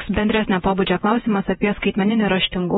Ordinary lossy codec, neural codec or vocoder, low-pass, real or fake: AAC, 16 kbps; vocoder, 48 kHz, 128 mel bands, Vocos; 19.8 kHz; fake